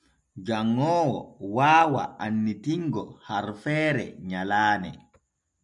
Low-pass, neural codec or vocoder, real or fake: 10.8 kHz; none; real